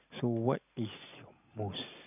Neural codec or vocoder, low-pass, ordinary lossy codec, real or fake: none; 3.6 kHz; none; real